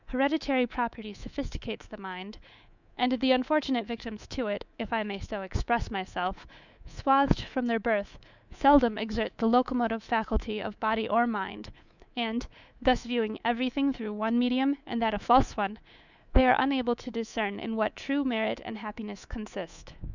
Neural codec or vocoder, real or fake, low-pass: codec, 24 kHz, 3.1 kbps, DualCodec; fake; 7.2 kHz